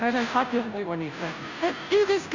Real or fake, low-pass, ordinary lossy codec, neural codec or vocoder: fake; 7.2 kHz; none; codec, 16 kHz, 0.5 kbps, FunCodec, trained on Chinese and English, 25 frames a second